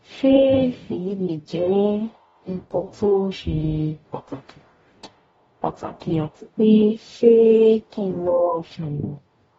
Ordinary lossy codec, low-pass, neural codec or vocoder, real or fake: AAC, 24 kbps; 19.8 kHz; codec, 44.1 kHz, 0.9 kbps, DAC; fake